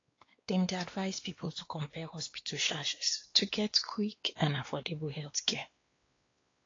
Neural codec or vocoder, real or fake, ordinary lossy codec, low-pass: codec, 16 kHz, 2 kbps, X-Codec, WavLM features, trained on Multilingual LibriSpeech; fake; AAC, 32 kbps; 7.2 kHz